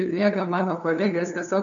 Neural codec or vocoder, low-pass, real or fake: codec, 16 kHz, 2 kbps, FunCodec, trained on LibriTTS, 25 frames a second; 7.2 kHz; fake